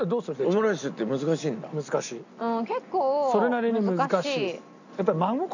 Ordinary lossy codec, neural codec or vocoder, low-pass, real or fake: none; none; 7.2 kHz; real